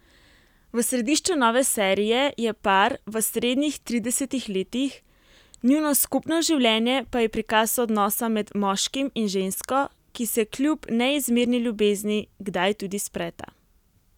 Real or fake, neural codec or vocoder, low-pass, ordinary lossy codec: real; none; 19.8 kHz; none